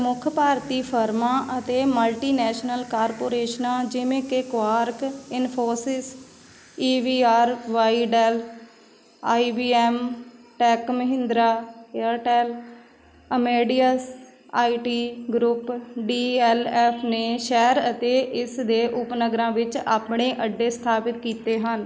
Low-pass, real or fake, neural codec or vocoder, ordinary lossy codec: none; real; none; none